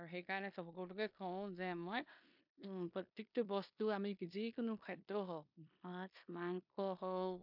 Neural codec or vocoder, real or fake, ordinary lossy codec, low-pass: codec, 16 kHz in and 24 kHz out, 0.9 kbps, LongCat-Audio-Codec, four codebook decoder; fake; MP3, 48 kbps; 5.4 kHz